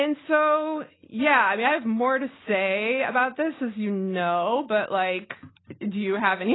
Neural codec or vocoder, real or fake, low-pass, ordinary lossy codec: none; real; 7.2 kHz; AAC, 16 kbps